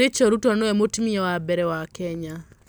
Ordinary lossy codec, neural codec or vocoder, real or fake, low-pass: none; none; real; none